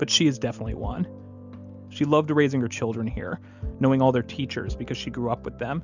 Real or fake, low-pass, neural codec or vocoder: real; 7.2 kHz; none